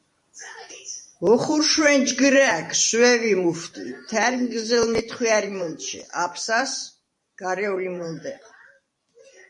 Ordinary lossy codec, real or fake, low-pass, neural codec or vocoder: MP3, 48 kbps; fake; 10.8 kHz; vocoder, 44.1 kHz, 128 mel bands every 512 samples, BigVGAN v2